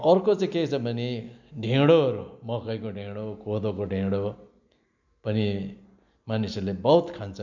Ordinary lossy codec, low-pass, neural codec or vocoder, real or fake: none; 7.2 kHz; none; real